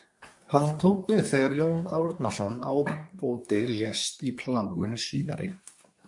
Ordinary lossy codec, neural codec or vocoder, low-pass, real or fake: MP3, 64 kbps; codec, 24 kHz, 1 kbps, SNAC; 10.8 kHz; fake